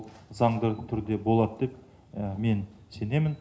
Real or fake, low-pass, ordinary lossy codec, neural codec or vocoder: real; none; none; none